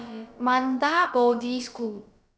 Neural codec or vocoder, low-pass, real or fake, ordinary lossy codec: codec, 16 kHz, about 1 kbps, DyCAST, with the encoder's durations; none; fake; none